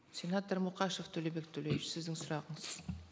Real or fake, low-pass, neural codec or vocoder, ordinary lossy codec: real; none; none; none